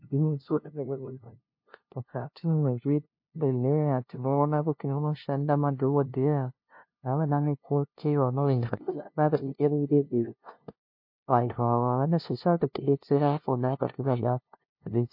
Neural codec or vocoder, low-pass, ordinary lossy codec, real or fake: codec, 16 kHz, 0.5 kbps, FunCodec, trained on LibriTTS, 25 frames a second; 5.4 kHz; MP3, 32 kbps; fake